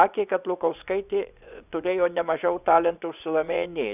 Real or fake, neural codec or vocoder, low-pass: fake; vocoder, 24 kHz, 100 mel bands, Vocos; 3.6 kHz